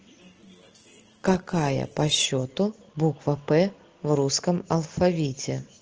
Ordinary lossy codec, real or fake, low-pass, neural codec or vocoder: Opus, 16 kbps; real; 7.2 kHz; none